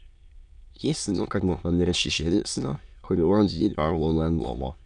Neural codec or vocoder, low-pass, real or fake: autoencoder, 22.05 kHz, a latent of 192 numbers a frame, VITS, trained on many speakers; 9.9 kHz; fake